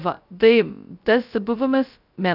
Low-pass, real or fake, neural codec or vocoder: 5.4 kHz; fake; codec, 16 kHz, 0.2 kbps, FocalCodec